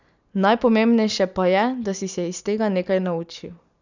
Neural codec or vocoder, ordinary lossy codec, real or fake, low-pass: none; none; real; 7.2 kHz